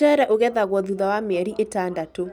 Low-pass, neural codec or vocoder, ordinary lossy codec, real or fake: 19.8 kHz; none; none; real